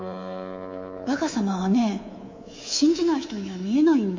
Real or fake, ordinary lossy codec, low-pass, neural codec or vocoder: fake; MP3, 48 kbps; 7.2 kHz; codec, 24 kHz, 3.1 kbps, DualCodec